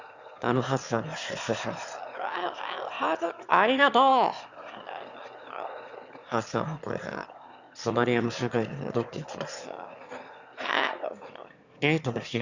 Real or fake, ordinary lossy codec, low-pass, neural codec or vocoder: fake; Opus, 64 kbps; 7.2 kHz; autoencoder, 22.05 kHz, a latent of 192 numbers a frame, VITS, trained on one speaker